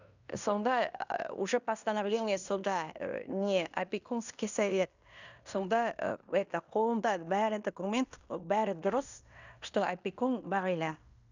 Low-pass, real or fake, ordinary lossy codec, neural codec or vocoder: 7.2 kHz; fake; none; codec, 16 kHz in and 24 kHz out, 0.9 kbps, LongCat-Audio-Codec, fine tuned four codebook decoder